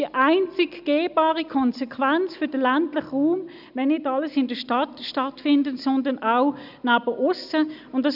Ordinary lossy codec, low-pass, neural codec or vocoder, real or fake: none; 5.4 kHz; none; real